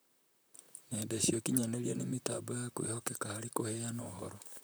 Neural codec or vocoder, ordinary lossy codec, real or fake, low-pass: vocoder, 44.1 kHz, 128 mel bands, Pupu-Vocoder; none; fake; none